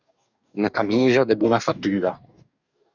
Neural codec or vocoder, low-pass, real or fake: codec, 44.1 kHz, 2.6 kbps, DAC; 7.2 kHz; fake